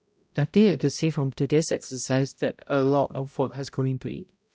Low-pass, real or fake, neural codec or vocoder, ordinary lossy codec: none; fake; codec, 16 kHz, 0.5 kbps, X-Codec, HuBERT features, trained on balanced general audio; none